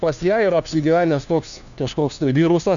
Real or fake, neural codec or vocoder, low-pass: fake; codec, 16 kHz, 1 kbps, FunCodec, trained on LibriTTS, 50 frames a second; 7.2 kHz